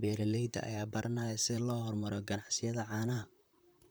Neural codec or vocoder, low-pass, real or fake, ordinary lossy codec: vocoder, 44.1 kHz, 128 mel bands, Pupu-Vocoder; none; fake; none